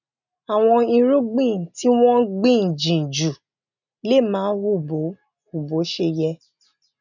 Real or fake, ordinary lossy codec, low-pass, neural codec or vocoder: real; none; 7.2 kHz; none